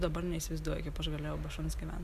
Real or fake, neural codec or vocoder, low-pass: real; none; 14.4 kHz